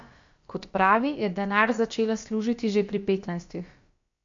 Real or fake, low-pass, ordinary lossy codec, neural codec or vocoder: fake; 7.2 kHz; MP3, 48 kbps; codec, 16 kHz, about 1 kbps, DyCAST, with the encoder's durations